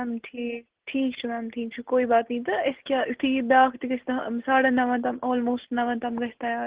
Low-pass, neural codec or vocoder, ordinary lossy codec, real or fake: 3.6 kHz; none; Opus, 16 kbps; real